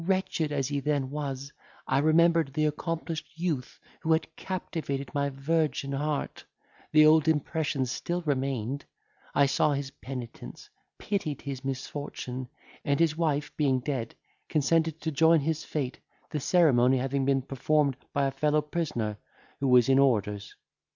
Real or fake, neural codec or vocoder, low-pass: real; none; 7.2 kHz